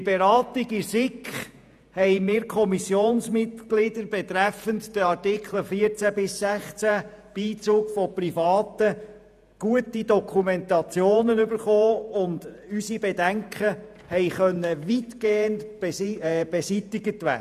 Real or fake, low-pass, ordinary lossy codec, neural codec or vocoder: fake; 14.4 kHz; none; vocoder, 48 kHz, 128 mel bands, Vocos